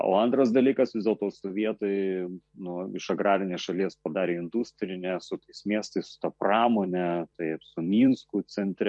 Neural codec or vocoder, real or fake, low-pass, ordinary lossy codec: vocoder, 44.1 kHz, 128 mel bands every 256 samples, BigVGAN v2; fake; 10.8 kHz; MP3, 48 kbps